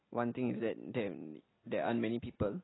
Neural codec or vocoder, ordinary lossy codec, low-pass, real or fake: none; AAC, 16 kbps; 7.2 kHz; real